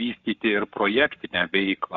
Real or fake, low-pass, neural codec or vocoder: fake; 7.2 kHz; codec, 44.1 kHz, 7.8 kbps, Pupu-Codec